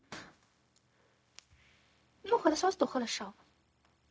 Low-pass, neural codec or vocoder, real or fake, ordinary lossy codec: none; codec, 16 kHz, 0.4 kbps, LongCat-Audio-Codec; fake; none